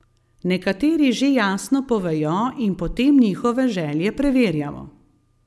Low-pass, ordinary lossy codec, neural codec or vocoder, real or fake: none; none; none; real